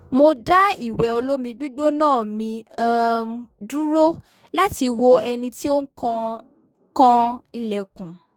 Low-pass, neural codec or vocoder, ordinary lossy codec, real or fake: 19.8 kHz; codec, 44.1 kHz, 2.6 kbps, DAC; none; fake